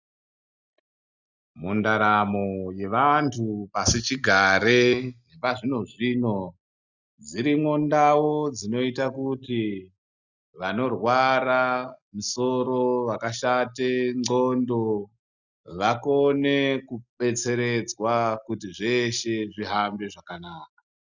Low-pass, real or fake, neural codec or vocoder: 7.2 kHz; real; none